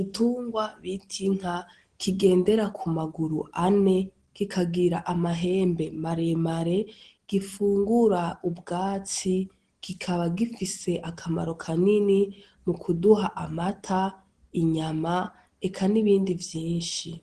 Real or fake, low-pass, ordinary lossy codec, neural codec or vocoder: real; 9.9 kHz; Opus, 16 kbps; none